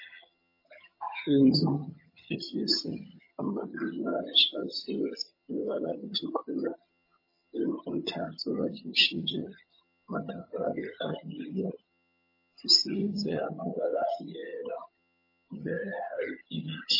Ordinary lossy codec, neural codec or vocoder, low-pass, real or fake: MP3, 24 kbps; vocoder, 22.05 kHz, 80 mel bands, HiFi-GAN; 5.4 kHz; fake